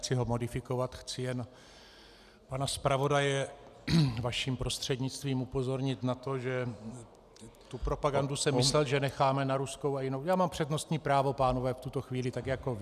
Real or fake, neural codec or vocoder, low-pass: real; none; 14.4 kHz